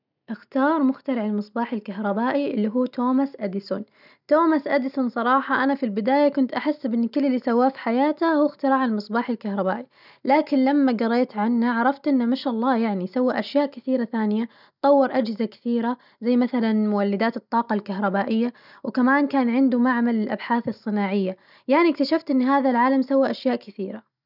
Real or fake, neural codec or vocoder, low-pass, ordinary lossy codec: real; none; 5.4 kHz; none